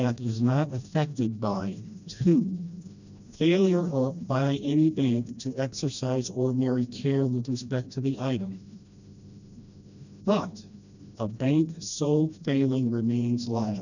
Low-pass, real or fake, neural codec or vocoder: 7.2 kHz; fake; codec, 16 kHz, 1 kbps, FreqCodec, smaller model